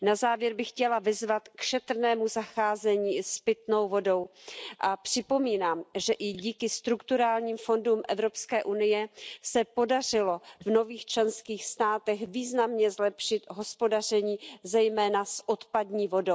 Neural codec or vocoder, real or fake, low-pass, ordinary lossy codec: none; real; none; none